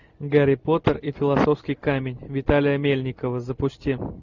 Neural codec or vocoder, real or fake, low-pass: none; real; 7.2 kHz